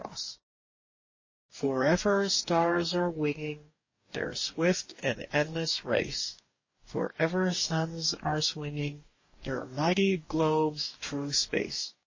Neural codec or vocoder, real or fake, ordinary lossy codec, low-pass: codec, 44.1 kHz, 2.6 kbps, DAC; fake; MP3, 32 kbps; 7.2 kHz